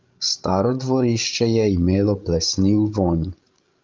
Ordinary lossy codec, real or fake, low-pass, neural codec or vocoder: Opus, 32 kbps; fake; 7.2 kHz; codec, 16 kHz, 16 kbps, FreqCodec, larger model